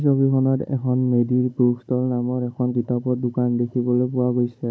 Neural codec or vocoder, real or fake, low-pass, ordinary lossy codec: codec, 16 kHz, 16 kbps, FunCodec, trained on Chinese and English, 50 frames a second; fake; none; none